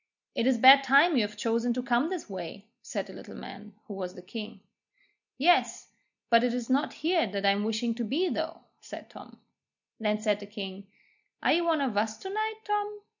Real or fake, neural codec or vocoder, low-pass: real; none; 7.2 kHz